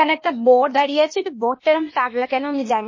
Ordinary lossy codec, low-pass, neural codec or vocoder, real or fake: MP3, 32 kbps; 7.2 kHz; codec, 16 kHz, 0.8 kbps, ZipCodec; fake